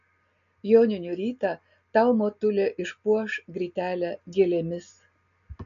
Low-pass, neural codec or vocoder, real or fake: 7.2 kHz; none; real